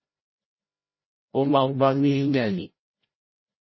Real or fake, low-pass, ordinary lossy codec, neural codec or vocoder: fake; 7.2 kHz; MP3, 24 kbps; codec, 16 kHz, 0.5 kbps, FreqCodec, larger model